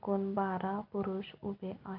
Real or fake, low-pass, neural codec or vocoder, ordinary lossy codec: real; 5.4 kHz; none; Opus, 24 kbps